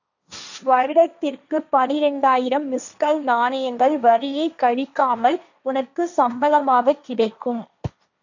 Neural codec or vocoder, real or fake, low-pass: codec, 16 kHz, 1.1 kbps, Voila-Tokenizer; fake; 7.2 kHz